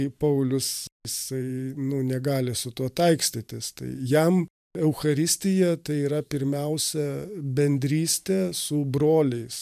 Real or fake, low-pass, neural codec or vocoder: fake; 14.4 kHz; vocoder, 44.1 kHz, 128 mel bands every 512 samples, BigVGAN v2